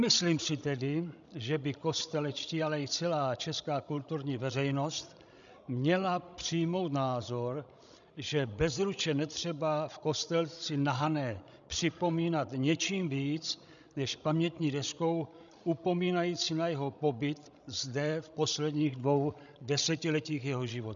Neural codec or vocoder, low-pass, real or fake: codec, 16 kHz, 16 kbps, FreqCodec, larger model; 7.2 kHz; fake